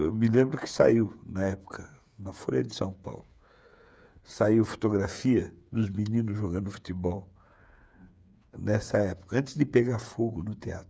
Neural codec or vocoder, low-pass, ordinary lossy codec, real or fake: codec, 16 kHz, 8 kbps, FreqCodec, smaller model; none; none; fake